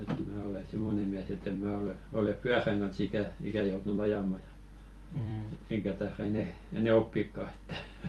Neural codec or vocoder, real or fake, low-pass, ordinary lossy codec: vocoder, 44.1 kHz, 128 mel bands every 256 samples, BigVGAN v2; fake; 19.8 kHz; Opus, 32 kbps